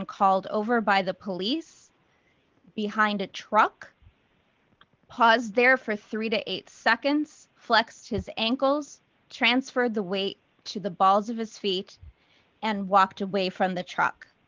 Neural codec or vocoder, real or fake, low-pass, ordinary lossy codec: none; real; 7.2 kHz; Opus, 32 kbps